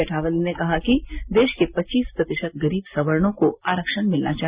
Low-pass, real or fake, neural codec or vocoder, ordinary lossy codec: 3.6 kHz; real; none; Opus, 64 kbps